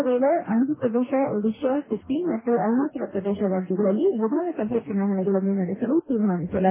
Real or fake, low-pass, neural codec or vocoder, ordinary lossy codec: fake; 3.6 kHz; codec, 44.1 kHz, 2.6 kbps, DAC; MP3, 16 kbps